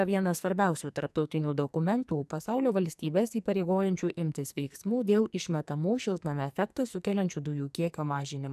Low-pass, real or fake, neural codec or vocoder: 14.4 kHz; fake; codec, 44.1 kHz, 2.6 kbps, SNAC